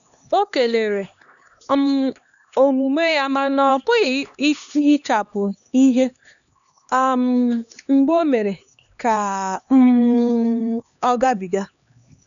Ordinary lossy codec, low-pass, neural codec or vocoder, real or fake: none; 7.2 kHz; codec, 16 kHz, 2 kbps, X-Codec, HuBERT features, trained on LibriSpeech; fake